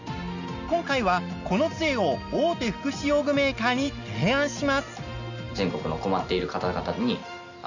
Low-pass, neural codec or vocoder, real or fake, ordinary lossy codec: 7.2 kHz; none; real; none